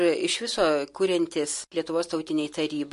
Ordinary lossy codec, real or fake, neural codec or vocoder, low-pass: MP3, 48 kbps; real; none; 14.4 kHz